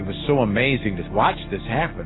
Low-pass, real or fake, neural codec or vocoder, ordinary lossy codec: 7.2 kHz; real; none; AAC, 16 kbps